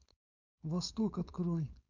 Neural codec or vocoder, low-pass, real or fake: codec, 16 kHz, 4.8 kbps, FACodec; 7.2 kHz; fake